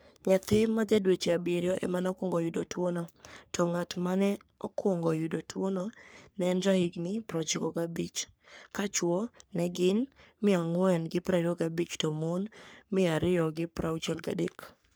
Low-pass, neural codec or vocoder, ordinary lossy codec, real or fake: none; codec, 44.1 kHz, 3.4 kbps, Pupu-Codec; none; fake